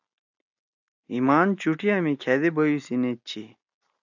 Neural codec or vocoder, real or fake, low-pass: none; real; 7.2 kHz